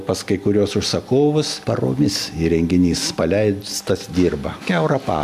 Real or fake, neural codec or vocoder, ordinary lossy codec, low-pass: real; none; AAC, 96 kbps; 14.4 kHz